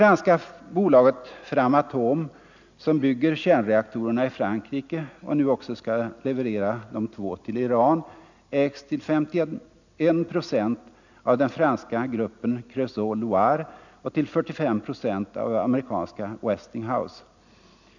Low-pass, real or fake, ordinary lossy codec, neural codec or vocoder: 7.2 kHz; real; none; none